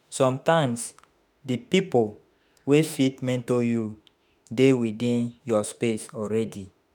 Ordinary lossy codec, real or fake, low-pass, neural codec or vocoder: none; fake; none; autoencoder, 48 kHz, 32 numbers a frame, DAC-VAE, trained on Japanese speech